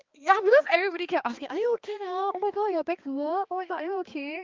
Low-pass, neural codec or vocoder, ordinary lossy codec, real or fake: 7.2 kHz; codec, 16 kHz, 2 kbps, X-Codec, HuBERT features, trained on balanced general audio; Opus, 32 kbps; fake